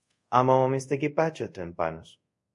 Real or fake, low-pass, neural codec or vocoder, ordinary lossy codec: fake; 10.8 kHz; codec, 24 kHz, 0.5 kbps, DualCodec; MP3, 64 kbps